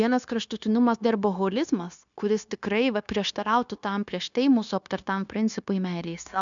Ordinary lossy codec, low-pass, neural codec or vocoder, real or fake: MP3, 96 kbps; 7.2 kHz; codec, 16 kHz, 0.9 kbps, LongCat-Audio-Codec; fake